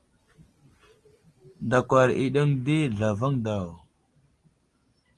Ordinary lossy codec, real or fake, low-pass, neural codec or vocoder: Opus, 24 kbps; real; 10.8 kHz; none